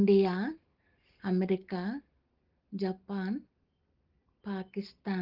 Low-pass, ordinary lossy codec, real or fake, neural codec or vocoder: 5.4 kHz; Opus, 16 kbps; real; none